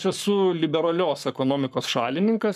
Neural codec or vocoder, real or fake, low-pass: codec, 44.1 kHz, 7.8 kbps, Pupu-Codec; fake; 14.4 kHz